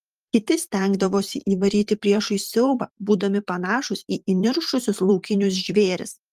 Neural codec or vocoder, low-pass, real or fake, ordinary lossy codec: vocoder, 44.1 kHz, 128 mel bands every 512 samples, BigVGAN v2; 14.4 kHz; fake; Opus, 32 kbps